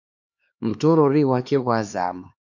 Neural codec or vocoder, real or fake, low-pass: codec, 16 kHz, 2 kbps, X-Codec, HuBERT features, trained on LibriSpeech; fake; 7.2 kHz